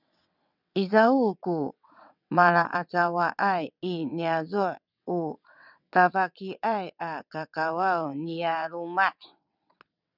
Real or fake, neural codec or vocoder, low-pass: fake; vocoder, 22.05 kHz, 80 mel bands, WaveNeXt; 5.4 kHz